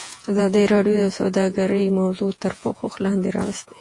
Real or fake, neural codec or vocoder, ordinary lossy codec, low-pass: fake; vocoder, 48 kHz, 128 mel bands, Vocos; AAC, 48 kbps; 10.8 kHz